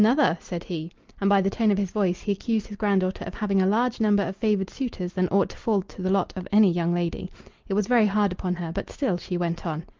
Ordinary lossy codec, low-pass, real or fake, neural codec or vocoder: Opus, 32 kbps; 7.2 kHz; real; none